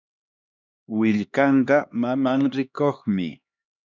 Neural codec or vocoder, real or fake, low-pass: codec, 16 kHz, 2 kbps, X-Codec, HuBERT features, trained on LibriSpeech; fake; 7.2 kHz